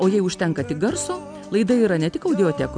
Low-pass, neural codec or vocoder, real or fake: 9.9 kHz; none; real